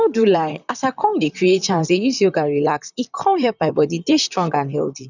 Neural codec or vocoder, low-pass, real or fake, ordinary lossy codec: vocoder, 44.1 kHz, 128 mel bands, Pupu-Vocoder; 7.2 kHz; fake; none